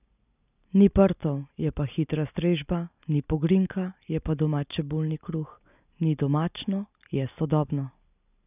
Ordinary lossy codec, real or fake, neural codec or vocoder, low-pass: none; real; none; 3.6 kHz